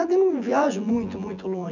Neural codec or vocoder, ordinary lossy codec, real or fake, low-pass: vocoder, 24 kHz, 100 mel bands, Vocos; none; fake; 7.2 kHz